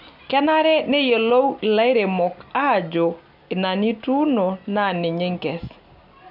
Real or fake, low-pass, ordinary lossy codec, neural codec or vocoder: real; 5.4 kHz; none; none